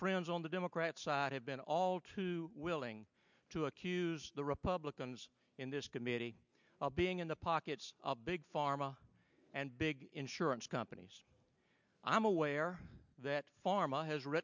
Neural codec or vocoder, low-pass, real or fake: none; 7.2 kHz; real